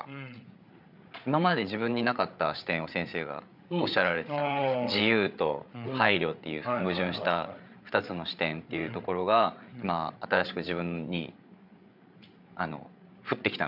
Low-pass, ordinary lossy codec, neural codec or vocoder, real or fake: 5.4 kHz; none; codec, 16 kHz, 8 kbps, FreqCodec, larger model; fake